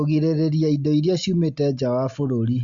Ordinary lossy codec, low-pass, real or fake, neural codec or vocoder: Opus, 64 kbps; 7.2 kHz; real; none